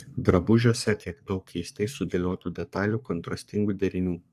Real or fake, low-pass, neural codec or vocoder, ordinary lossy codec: fake; 14.4 kHz; codec, 44.1 kHz, 3.4 kbps, Pupu-Codec; AAC, 96 kbps